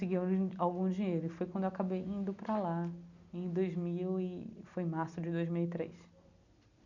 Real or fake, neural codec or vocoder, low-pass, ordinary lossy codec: real; none; 7.2 kHz; none